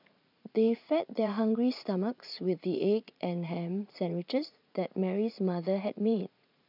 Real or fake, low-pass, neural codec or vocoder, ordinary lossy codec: fake; 5.4 kHz; vocoder, 44.1 kHz, 80 mel bands, Vocos; none